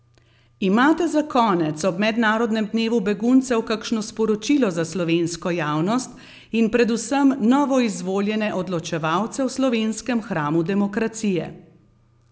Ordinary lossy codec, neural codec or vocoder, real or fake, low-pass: none; none; real; none